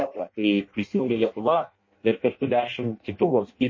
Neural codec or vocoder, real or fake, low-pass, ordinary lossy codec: codec, 16 kHz in and 24 kHz out, 0.6 kbps, FireRedTTS-2 codec; fake; 7.2 kHz; MP3, 32 kbps